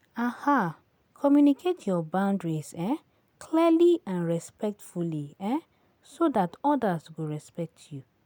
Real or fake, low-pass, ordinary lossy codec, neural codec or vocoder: real; none; none; none